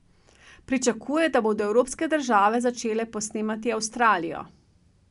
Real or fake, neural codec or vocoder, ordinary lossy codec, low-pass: real; none; none; 10.8 kHz